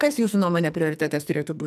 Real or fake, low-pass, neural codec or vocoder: fake; 14.4 kHz; codec, 44.1 kHz, 2.6 kbps, SNAC